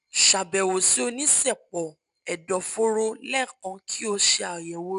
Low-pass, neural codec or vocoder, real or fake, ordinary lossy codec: 10.8 kHz; none; real; none